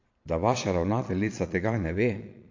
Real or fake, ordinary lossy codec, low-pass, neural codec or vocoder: fake; MP3, 48 kbps; 7.2 kHz; vocoder, 22.05 kHz, 80 mel bands, Vocos